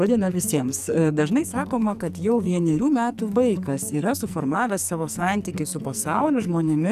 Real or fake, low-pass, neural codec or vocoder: fake; 14.4 kHz; codec, 44.1 kHz, 2.6 kbps, SNAC